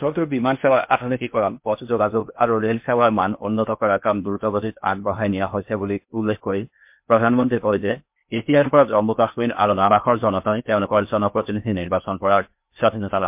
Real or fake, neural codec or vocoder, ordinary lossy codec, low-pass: fake; codec, 16 kHz in and 24 kHz out, 0.6 kbps, FocalCodec, streaming, 2048 codes; MP3, 32 kbps; 3.6 kHz